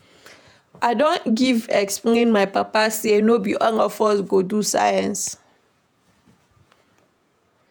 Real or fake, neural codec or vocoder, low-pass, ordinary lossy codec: fake; vocoder, 48 kHz, 128 mel bands, Vocos; none; none